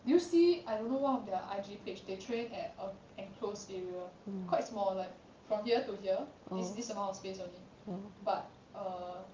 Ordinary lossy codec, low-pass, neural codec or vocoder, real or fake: Opus, 24 kbps; 7.2 kHz; none; real